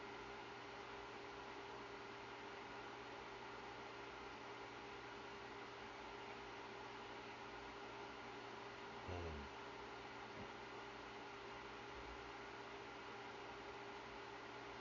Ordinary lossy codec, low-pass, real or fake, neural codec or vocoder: MP3, 48 kbps; 7.2 kHz; real; none